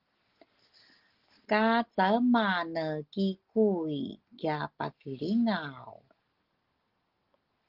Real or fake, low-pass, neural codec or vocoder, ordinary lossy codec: real; 5.4 kHz; none; Opus, 16 kbps